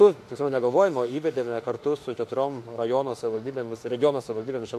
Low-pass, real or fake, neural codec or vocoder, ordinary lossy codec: 14.4 kHz; fake; autoencoder, 48 kHz, 32 numbers a frame, DAC-VAE, trained on Japanese speech; AAC, 64 kbps